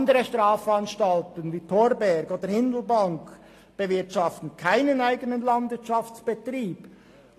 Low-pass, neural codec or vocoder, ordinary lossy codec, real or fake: 14.4 kHz; none; AAC, 48 kbps; real